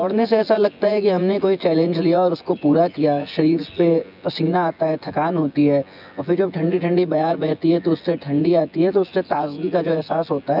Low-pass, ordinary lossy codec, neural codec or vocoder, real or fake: 5.4 kHz; none; vocoder, 24 kHz, 100 mel bands, Vocos; fake